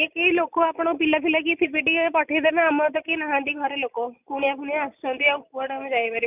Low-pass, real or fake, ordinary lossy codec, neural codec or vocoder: 3.6 kHz; real; none; none